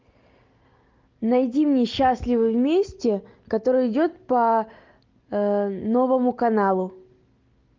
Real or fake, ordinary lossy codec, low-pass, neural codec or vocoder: real; Opus, 32 kbps; 7.2 kHz; none